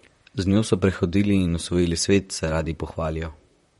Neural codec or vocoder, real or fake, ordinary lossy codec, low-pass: vocoder, 44.1 kHz, 128 mel bands, Pupu-Vocoder; fake; MP3, 48 kbps; 19.8 kHz